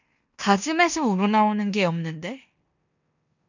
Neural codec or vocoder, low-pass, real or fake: codec, 16 kHz in and 24 kHz out, 0.9 kbps, LongCat-Audio-Codec, four codebook decoder; 7.2 kHz; fake